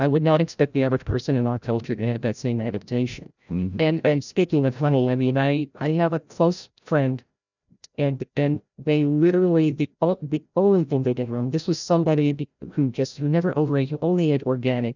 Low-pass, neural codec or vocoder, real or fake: 7.2 kHz; codec, 16 kHz, 0.5 kbps, FreqCodec, larger model; fake